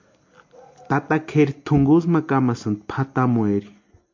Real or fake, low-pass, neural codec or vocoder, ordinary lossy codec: real; 7.2 kHz; none; MP3, 48 kbps